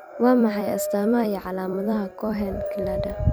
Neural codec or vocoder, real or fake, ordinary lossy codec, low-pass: vocoder, 44.1 kHz, 128 mel bands every 256 samples, BigVGAN v2; fake; none; none